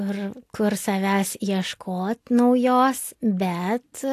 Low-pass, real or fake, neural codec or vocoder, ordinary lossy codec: 14.4 kHz; real; none; AAC, 64 kbps